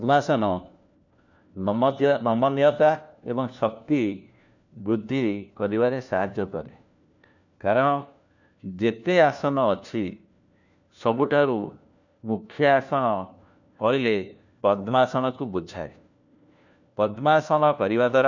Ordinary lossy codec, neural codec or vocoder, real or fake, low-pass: none; codec, 16 kHz, 1 kbps, FunCodec, trained on LibriTTS, 50 frames a second; fake; 7.2 kHz